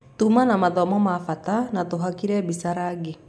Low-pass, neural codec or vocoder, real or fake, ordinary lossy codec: none; none; real; none